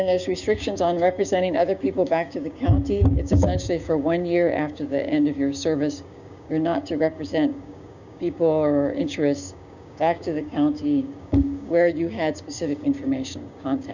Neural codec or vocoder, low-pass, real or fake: codec, 16 kHz, 6 kbps, DAC; 7.2 kHz; fake